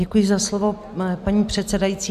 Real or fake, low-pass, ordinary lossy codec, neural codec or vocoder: real; 14.4 kHz; MP3, 96 kbps; none